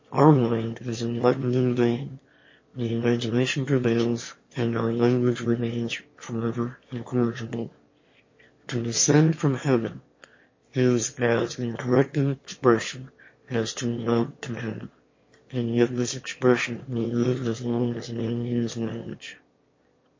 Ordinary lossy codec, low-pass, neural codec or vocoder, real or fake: MP3, 32 kbps; 7.2 kHz; autoencoder, 22.05 kHz, a latent of 192 numbers a frame, VITS, trained on one speaker; fake